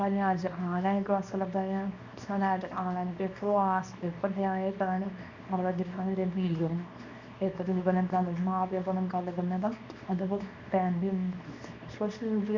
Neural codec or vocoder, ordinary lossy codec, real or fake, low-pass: codec, 24 kHz, 0.9 kbps, WavTokenizer, small release; none; fake; 7.2 kHz